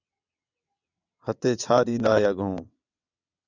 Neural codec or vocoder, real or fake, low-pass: vocoder, 22.05 kHz, 80 mel bands, WaveNeXt; fake; 7.2 kHz